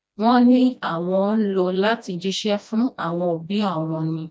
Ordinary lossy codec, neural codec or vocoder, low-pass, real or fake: none; codec, 16 kHz, 1 kbps, FreqCodec, smaller model; none; fake